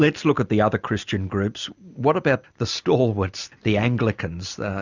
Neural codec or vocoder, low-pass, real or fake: none; 7.2 kHz; real